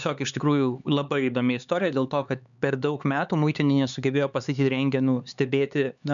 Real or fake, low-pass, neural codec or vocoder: fake; 7.2 kHz; codec, 16 kHz, 4 kbps, X-Codec, HuBERT features, trained on LibriSpeech